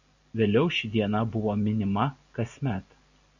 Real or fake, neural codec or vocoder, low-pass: real; none; 7.2 kHz